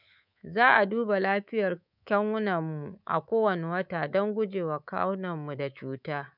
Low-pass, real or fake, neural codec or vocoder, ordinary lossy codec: 5.4 kHz; fake; codec, 24 kHz, 3.1 kbps, DualCodec; none